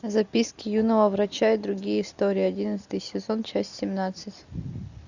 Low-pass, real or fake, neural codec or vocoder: 7.2 kHz; real; none